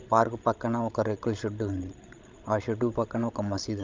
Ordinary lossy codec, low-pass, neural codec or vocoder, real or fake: Opus, 24 kbps; 7.2 kHz; codec, 16 kHz, 16 kbps, FreqCodec, larger model; fake